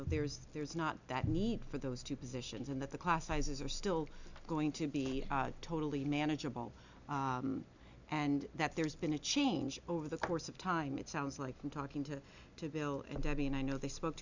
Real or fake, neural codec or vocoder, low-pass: real; none; 7.2 kHz